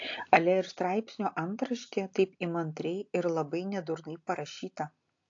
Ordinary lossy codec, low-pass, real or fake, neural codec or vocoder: AAC, 48 kbps; 7.2 kHz; real; none